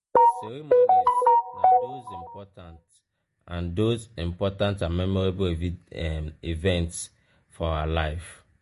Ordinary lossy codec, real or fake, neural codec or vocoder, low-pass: MP3, 48 kbps; fake; vocoder, 48 kHz, 128 mel bands, Vocos; 14.4 kHz